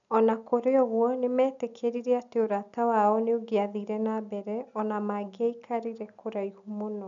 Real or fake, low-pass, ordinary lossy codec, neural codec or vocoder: real; 7.2 kHz; none; none